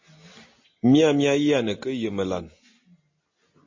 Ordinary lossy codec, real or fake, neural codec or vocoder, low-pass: MP3, 32 kbps; real; none; 7.2 kHz